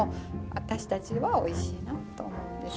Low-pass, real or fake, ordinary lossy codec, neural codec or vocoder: none; real; none; none